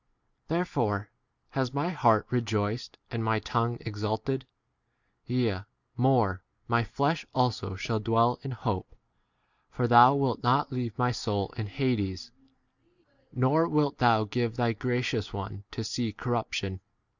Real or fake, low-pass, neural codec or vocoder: real; 7.2 kHz; none